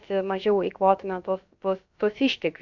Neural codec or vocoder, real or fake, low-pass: codec, 16 kHz, about 1 kbps, DyCAST, with the encoder's durations; fake; 7.2 kHz